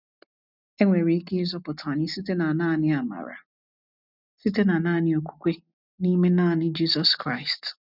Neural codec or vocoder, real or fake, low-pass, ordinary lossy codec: none; real; 5.4 kHz; none